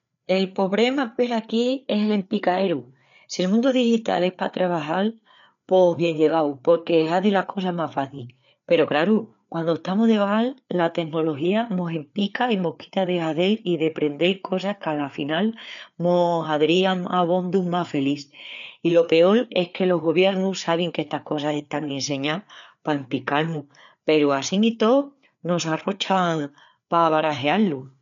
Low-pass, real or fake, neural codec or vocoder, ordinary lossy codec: 7.2 kHz; fake; codec, 16 kHz, 4 kbps, FreqCodec, larger model; none